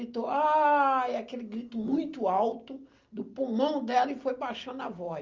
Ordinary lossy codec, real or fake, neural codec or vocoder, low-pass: Opus, 32 kbps; real; none; 7.2 kHz